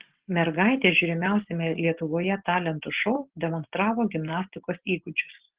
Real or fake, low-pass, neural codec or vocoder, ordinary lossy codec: real; 3.6 kHz; none; Opus, 16 kbps